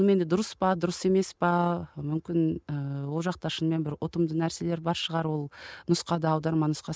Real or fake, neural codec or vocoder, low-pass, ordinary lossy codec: real; none; none; none